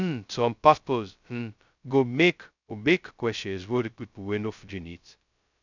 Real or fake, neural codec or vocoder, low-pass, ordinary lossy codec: fake; codec, 16 kHz, 0.2 kbps, FocalCodec; 7.2 kHz; none